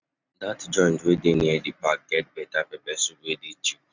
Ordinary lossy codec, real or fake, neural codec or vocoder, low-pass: none; real; none; 7.2 kHz